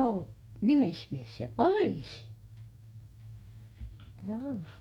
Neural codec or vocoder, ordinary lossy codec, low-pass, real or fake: codec, 44.1 kHz, 2.6 kbps, DAC; none; 19.8 kHz; fake